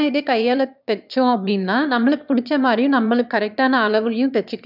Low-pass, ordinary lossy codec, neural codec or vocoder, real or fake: 5.4 kHz; none; autoencoder, 22.05 kHz, a latent of 192 numbers a frame, VITS, trained on one speaker; fake